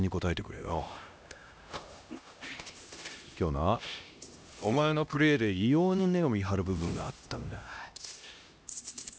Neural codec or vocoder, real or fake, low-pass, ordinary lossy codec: codec, 16 kHz, 1 kbps, X-Codec, HuBERT features, trained on LibriSpeech; fake; none; none